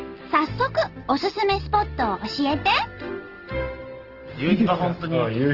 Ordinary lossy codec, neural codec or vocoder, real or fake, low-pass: Opus, 16 kbps; none; real; 5.4 kHz